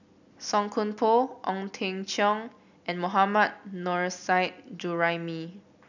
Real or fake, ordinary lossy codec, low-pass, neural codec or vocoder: real; none; 7.2 kHz; none